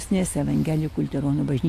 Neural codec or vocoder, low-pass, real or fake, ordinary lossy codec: none; 14.4 kHz; real; AAC, 64 kbps